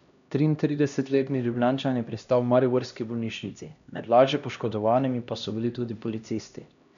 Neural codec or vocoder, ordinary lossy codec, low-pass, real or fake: codec, 16 kHz, 1 kbps, X-Codec, HuBERT features, trained on LibriSpeech; none; 7.2 kHz; fake